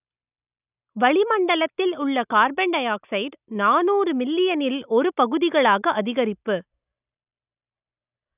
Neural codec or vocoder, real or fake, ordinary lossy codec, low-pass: none; real; none; 3.6 kHz